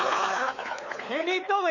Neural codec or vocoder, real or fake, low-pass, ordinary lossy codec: codec, 16 kHz, 4 kbps, X-Codec, WavLM features, trained on Multilingual LibriSpeech; fake; 7.2 kHz; none